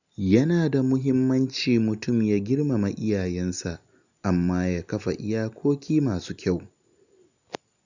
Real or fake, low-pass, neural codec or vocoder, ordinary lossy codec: real; 7.2 kHz; none; none